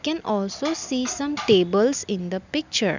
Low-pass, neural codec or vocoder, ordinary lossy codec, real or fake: 7.2 kHz; none; none; real